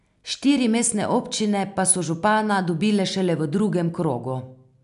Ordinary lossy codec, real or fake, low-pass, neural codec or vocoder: none; real; 10.8 kHz; none